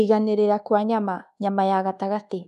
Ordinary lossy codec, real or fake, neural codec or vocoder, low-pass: none; fake; codec, 24 kHz, 1.2 kbps, DualCodec; 10.8 kHz